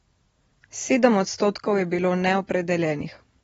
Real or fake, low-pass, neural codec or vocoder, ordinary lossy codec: real; 19.8 kHz; none; AAC, 24 kbps